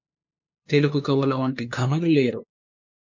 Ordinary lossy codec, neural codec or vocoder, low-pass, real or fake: MP3, 48 kbps; codec, 16 kHz, 2 kbps, FunCodec, trained on LibriTTS, 25 frames a second; 7.2 kHz; fake